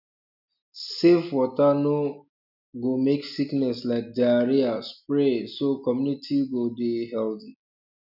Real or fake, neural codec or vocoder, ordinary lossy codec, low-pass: real; none; none; 5.4 kHz